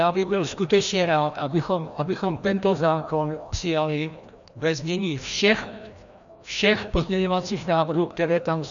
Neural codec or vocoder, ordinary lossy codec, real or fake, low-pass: codec, 16 kHz, 1 kbps, FreqCodec, larger model; MP3, 96 kbps; fake; 7.2 kHz